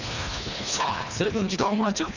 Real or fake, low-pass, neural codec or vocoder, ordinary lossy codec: fake; 7.2 kHz; codec, 24 kHz, 1.5 kbps, HILCodec; none